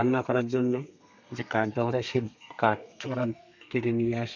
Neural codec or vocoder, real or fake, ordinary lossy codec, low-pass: codec, 32 kHz, 1.9 kbps, SNAC; fake; none; 7.2 kHz